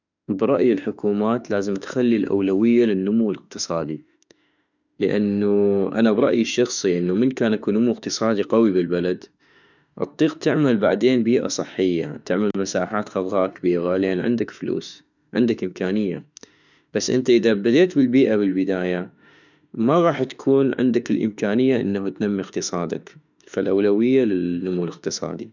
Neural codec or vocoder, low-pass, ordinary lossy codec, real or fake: autoencoder, 48 kHz, 32 numbers a frame, DAC-VAE, trained on Japanese speech; 7.2 kHz; none; fake